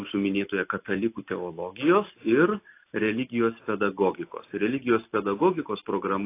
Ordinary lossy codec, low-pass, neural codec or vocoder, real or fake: AAC, 24 kbps; 3.6 kHz; none; real